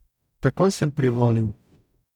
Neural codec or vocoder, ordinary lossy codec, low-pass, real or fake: codec, 44.1 kHz, 0.9 kbps, DAC; none; 19.8 kHz; fake